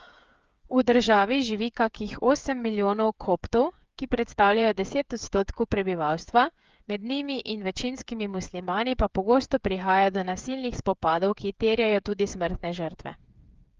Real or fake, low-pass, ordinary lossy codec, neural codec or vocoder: fake; 7.2 kHz; Opus, 32 kbps; codec, 16 kHz, 8 kbps, FreqCodec, smaller model